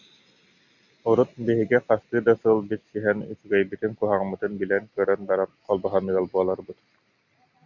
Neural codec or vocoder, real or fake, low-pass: none; real; 7.2 kHz